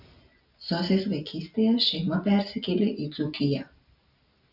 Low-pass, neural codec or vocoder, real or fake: 5.4 kHz; none; real